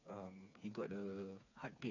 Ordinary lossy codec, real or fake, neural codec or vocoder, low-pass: none; fake; codec, 16 kHz, 4 kbps, FreqCodec, smaller model; 7.2 kHz